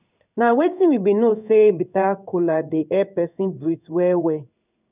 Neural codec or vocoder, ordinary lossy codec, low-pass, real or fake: codec, 16 kHz in and 24 kHz out, 1 kbps, XY-Tokenizer; none; 3.6 kHz; fake